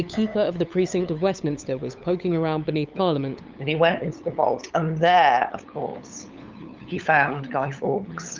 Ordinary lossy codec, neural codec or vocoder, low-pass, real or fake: Opus, 24 kbps; codec, 16 kHz, 8 kbps, FunCodec, trained on LibriTTS, 25 frames a second; 7.2 kHz; fake